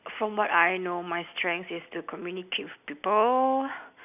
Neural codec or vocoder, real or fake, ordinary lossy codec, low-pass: none; real; none; 3.6 kHz